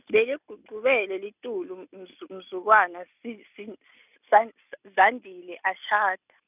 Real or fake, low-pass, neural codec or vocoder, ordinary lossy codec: real; 3.6 kHz; none; none